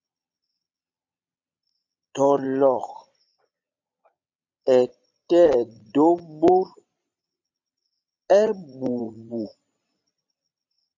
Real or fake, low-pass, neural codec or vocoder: fake; 7.2 kHz; vocoder, 22.05 kHz, 80 mel bands, Vocos